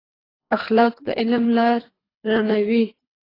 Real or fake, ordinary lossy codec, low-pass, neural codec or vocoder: fake; AAC, 24 kbps; 5.4 kHz; codec, 24 kHz, 3 kbps, HILCodec